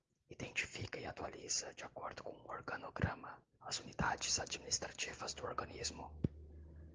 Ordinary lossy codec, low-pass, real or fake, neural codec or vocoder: Opus, 16 kbps; 7.2 kHz; real; none